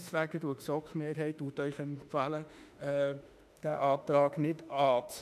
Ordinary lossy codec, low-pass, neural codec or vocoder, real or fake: none; 14.4 kHz; autoencoder, 48 kHz, 32 numbers a frame, DAC-VAE, trained on Japanese speech; fake